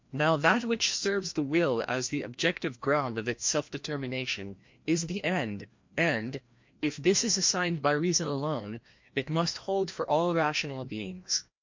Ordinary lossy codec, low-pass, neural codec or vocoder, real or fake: MP3, 48 kbps; 7.2 kHz; codec, 16 kHz, 1 kbps, FreqCodec, larger model; fake